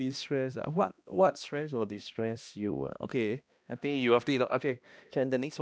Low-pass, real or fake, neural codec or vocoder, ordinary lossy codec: none; fake; codec, 16 kHz, 1 kbps, X-Codec, HuBERT features, trained on balanced general audio; none